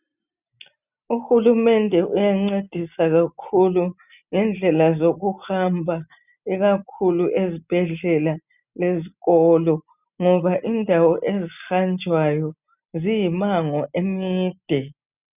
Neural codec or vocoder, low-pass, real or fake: none; 3.6 kHz; real